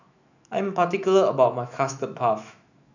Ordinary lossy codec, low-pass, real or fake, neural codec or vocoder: none; 7.2 kHz; fake; autoencoder, 48 kHz, 128 numbers a frame, DAC-VAE, trained on Japanese speech